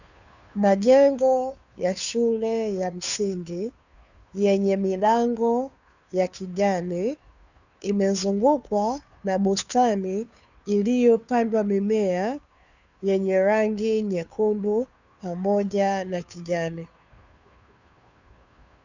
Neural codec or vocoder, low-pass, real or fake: codec, 16 kHz, 2 kbps, FunCodec, trained on Chinese and English, 25 frames a second; 7.2 kHz; fake